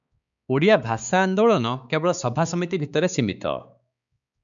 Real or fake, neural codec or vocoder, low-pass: fake; codec, 16 kHz, 4 kbps, X-Codec, HuBERT features, trained on balanced general audio; 7.2 kHz